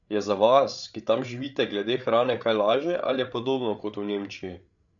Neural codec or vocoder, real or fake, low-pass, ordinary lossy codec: codec, 16 kHz, 8 kbps, FreqCodec, larger model; fake; 7.2 kHz; none